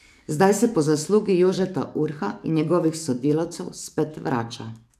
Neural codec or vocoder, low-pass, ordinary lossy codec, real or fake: codec, 44.1 kHz, 7.8 kbps, DAC; 14.4 kHz; none; fake